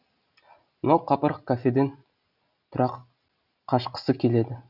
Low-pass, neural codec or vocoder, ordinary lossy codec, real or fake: 5.4 kHz; none; none; real